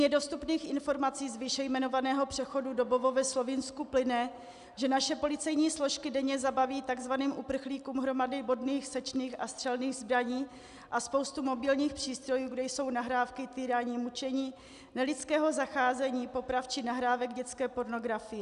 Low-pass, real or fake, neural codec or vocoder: 10.8 kHz; real; none